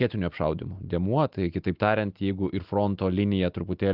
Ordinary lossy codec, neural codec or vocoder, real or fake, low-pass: Opus, 32 kbps; none; real; 5.4 kHz